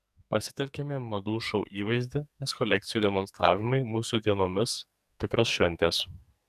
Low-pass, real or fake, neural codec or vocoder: 14.4 kHz; fake; codec, 44.1 kHz, 2.6 kbps, SNAC